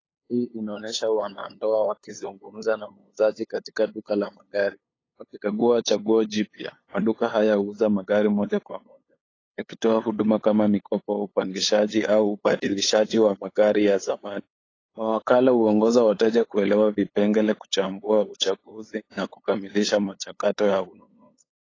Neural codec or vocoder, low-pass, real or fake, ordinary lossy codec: codec, 16 kHz, 8 kbps, FunCodec, trained on LibriTTS, 25 frames a second; 7.2 kHz; fake; AAC, 32 kbps